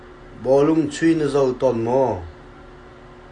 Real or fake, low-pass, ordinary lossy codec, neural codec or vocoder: real; 9.9 kHz; AAC, 48 kbps; none